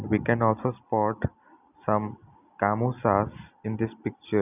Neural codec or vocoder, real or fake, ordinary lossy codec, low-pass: none; real; none; 3.6 kHz